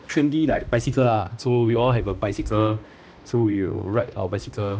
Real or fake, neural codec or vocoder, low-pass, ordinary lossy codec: fake; codec, 16 kHz, 1 kbps, X-Codec, HuBERT features, trained on balanced general audio; none; none